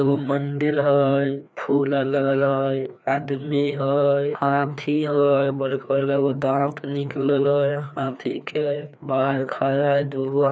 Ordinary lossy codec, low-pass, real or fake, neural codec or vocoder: none; none; fake; codec, 16 kHz, 2 kbps, FreqCodec, larger model